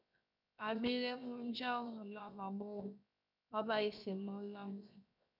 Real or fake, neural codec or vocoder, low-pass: fake; codec, 16 kHz, 0.7 kbps, FocalCodec; 5.4 kHz